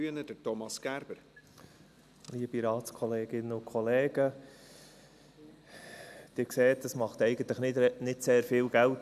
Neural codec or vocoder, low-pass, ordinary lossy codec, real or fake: none; 14.4 kHz; none; real